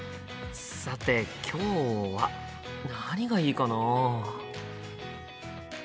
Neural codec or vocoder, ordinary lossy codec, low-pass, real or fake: none; none; none; real